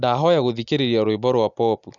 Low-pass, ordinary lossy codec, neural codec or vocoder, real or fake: 7.2 kHz; none; none; real